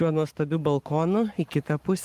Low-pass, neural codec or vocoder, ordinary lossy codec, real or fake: 14.4 kHz; codec, 44.1 kHz, 7.8 kbps, Pupu-Codec; Opus, 32 kbps; fake